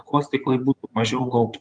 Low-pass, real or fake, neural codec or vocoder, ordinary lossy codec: 9.9 kHz; fake; vocoder, 24 kHz, 100 mel bands, Vocos; Opus, 32 kbps